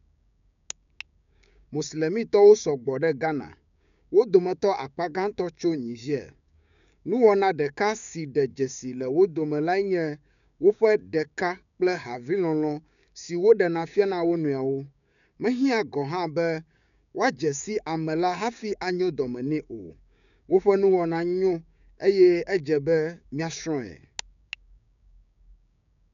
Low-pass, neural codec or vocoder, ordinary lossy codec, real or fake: 7.2 kHz; codec, 16 kHz, 6 kbps, DAC; none; fake